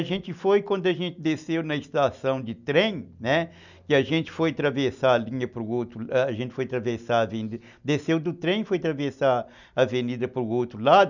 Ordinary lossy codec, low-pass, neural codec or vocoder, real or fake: none; 7.2 kHz; none; real